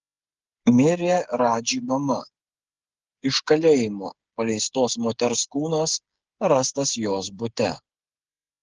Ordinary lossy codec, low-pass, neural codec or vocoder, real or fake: Opus, 16 kbps; 7.2 kHz; codec, 16 kHz, 8 kbps, FreqCodec, smaller model; fake